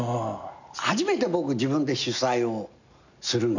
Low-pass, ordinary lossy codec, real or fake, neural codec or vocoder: 7.2 kHz; none; real; none